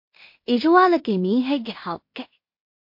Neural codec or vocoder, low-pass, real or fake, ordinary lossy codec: codec, 16 kHz in and 24 kHz out, 0.4 kbps, LongCat-Audio-Codec, two codebook decoder; 5.4 kHz; fake; MP3, 32 kbps